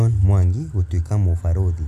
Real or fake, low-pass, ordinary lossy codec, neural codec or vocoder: real; 14.4 kHz; none; none